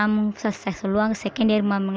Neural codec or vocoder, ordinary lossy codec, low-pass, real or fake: none; none; none; real